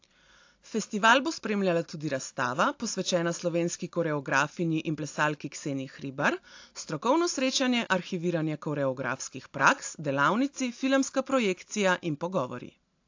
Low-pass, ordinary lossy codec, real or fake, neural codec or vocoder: 7.2 kHz; AAC, 48 kbps; real; none